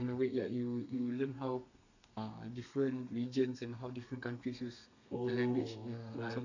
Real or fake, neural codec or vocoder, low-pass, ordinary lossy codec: fake; codec, 32 kHz, 1.9 kbps, SNAC; 7.2 kHz; none